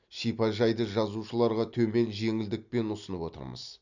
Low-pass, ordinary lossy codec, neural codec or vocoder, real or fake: 7.2 kHz; none; none; real